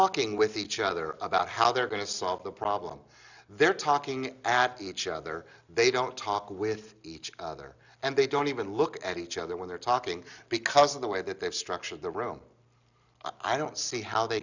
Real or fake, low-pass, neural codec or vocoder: real; 7.2 kHz; none